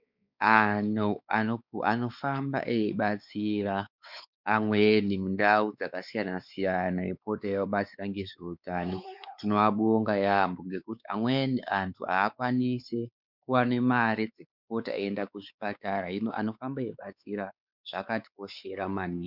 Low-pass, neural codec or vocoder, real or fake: 5.4 kHz; codec, 16 kHz, 4 kbps, X-Codec, WavLM features, trained on Multilingual LibriSpeech; fake